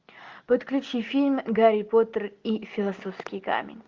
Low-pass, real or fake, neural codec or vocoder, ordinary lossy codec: 7.2 kHz; real; none; Opus, 32 kbps